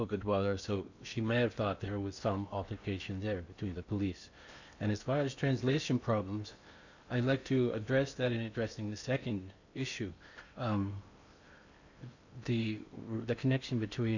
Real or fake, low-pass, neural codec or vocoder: fake; 7.2 kHz; codec, 16 kHz in and 24 kHz out, 0.8 kbps, FocalCodec, streaming, 65536 codes